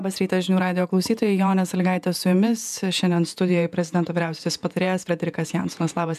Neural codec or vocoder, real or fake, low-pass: vocoder, 48 kHz, 128 mel bands, Vocos; fake; 14.4 kHz